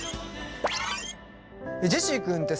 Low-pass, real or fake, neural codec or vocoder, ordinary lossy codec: none; real; none; none